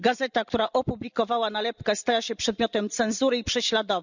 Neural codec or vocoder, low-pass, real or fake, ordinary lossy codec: vocoder, 44.1 kHz, 128 mel bands every 256 samples, BigVGAN v2; 7.2 kHz; fake; none